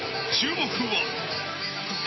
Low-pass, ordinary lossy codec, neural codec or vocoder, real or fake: 7.2 kHz; MP3, 24 kbps; none; real